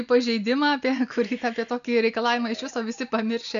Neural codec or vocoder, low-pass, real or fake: none; 7.2 kHz; real